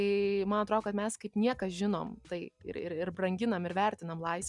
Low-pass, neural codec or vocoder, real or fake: 10.8 kHz; none; real